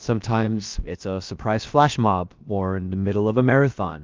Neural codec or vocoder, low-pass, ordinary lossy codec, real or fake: codec, 16 kHz, about 1 kbps, DyCAST, with the encoder's durations; 7.2 kHz; Opus, 24 kbps; fake